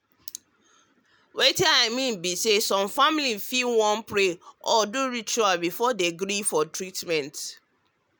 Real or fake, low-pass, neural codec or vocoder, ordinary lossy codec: real; none; none; none